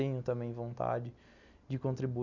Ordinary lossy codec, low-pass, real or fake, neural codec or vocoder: none; 7.2 kHz; real; none